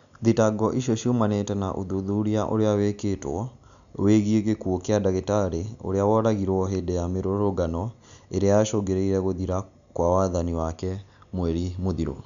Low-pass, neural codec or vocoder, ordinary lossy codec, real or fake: 7.2 kHz; none; none; real